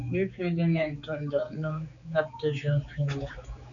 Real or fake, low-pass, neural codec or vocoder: fake; 7.2 kHz; codec, 16 kHz, 4 kbps, X-Codec, HuBERT features, trained on general audio